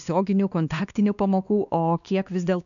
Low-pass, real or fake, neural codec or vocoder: 7.2 kHz; fake; codec, 16 kHz, 2 kbps, X-Codec, WavLM features, trained on Multilingual LibriSpeech